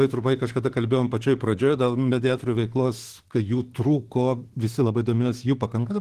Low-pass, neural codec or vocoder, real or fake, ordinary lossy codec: 14.4 kHz; autoencoder, 48 kHz, 32 numbers a frame, DAC-VAE, trained on Japanese speech; fake; Opus, 16 kbps